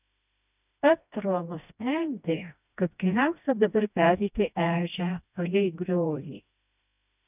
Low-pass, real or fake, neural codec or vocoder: 3.6 kHz; fake; codec, 16 kHz, 1 kbps, FreqCodec, smaller model